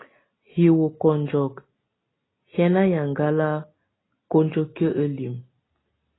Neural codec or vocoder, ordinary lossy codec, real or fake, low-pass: none; AAC, 16 kbps; real; 7.2 kHz